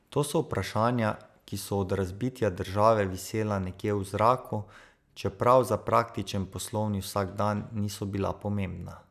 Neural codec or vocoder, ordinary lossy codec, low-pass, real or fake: none; none; 14.4 kHz; real